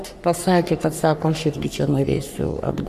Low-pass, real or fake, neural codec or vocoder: 14.4 kHz; fake; codec, 44.1 kHz, 3.4 kbps, Pupu-Codec